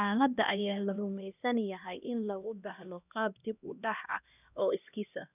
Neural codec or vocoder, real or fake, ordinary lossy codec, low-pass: codec, 16 kHz, 1 kbps, X-Codec, HuBERT features, trained on LibriSpeech; fake; none; 3.6 kHz